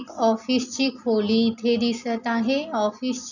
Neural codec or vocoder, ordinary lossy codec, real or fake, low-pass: none; none; real; 7.2 kHz